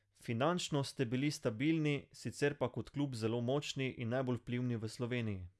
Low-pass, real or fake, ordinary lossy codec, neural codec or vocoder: none; real; none; none